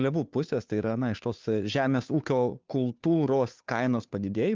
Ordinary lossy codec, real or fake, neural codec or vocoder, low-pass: Opus, 24 kbps; fake; codec, 16 kHz, 2 kbps, FunCodec, trained on Chinese and English, 25 frames a second; 7.2 kHz